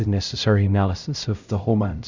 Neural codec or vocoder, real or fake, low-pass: codec, 16 kHz, 0.5 kbps, X-Codec, HuBERT features, trained on LibriSpeech; fake; 7.2 kHz